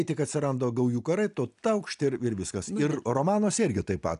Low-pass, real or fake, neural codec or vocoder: 10.8 kHz; real; none